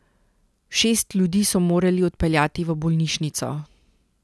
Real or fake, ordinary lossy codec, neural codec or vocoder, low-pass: real; none; none; none